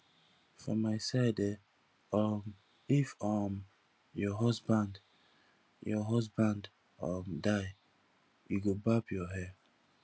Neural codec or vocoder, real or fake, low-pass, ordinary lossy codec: none; real; none; none